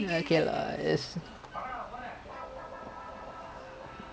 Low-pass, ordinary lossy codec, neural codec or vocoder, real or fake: none; none; none; real